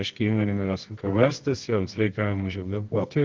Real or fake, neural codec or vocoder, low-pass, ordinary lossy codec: fake; codec, 24 kHz, 0.9 kbps, WavTokenizer, medium music audio release; 7.2 kHz; Opus, 16 kbps